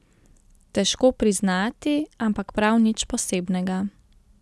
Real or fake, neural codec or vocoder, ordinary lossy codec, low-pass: real; none; none; none